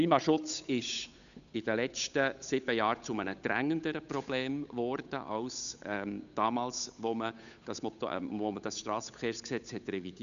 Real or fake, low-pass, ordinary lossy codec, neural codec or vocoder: fake; 7.2 kHz; none; codec, 16 kHz, 8 kbps, FunCodec, trained on Chinese and English, 25 frames a second